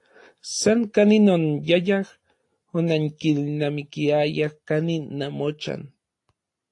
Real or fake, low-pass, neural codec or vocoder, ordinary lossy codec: real; 10.8 kHz; none; AAC, 48 kbps